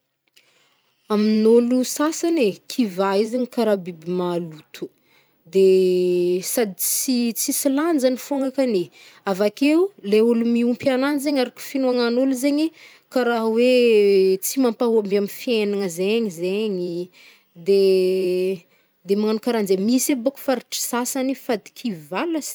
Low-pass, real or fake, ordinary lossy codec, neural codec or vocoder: none; fake; none; vocoder, 44.1 kHz, 128 mel bands every 512 samples, BigVGAN v2